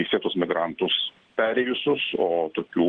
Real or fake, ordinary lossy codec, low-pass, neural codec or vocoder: real; Opus, 16 kbps; 9.9 kHz; none